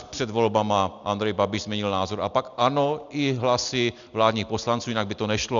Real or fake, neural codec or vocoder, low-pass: real; none; 7.2 kHz